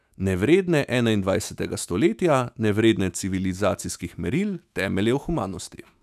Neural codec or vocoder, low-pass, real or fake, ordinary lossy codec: autoencoder, 48 kHz, 128 numbers a frame, DAC-VAE, trained on Japanese speech; 14.4 kHz; fake; none